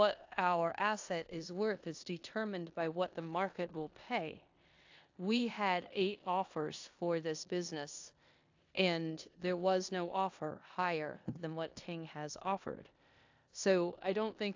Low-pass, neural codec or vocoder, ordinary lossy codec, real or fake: 7.2 kHz; codec, 16 kHz in and 24 kHz out, 0.9 kbps, LongCat-Audio-Codec, four codebook decoder; AAC, 48 kbps; fake